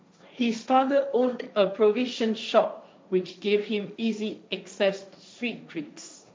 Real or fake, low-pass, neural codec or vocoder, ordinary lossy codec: fake; none; codec, 16 kHz, 1.1 kbps, Voila-Tokenizer; none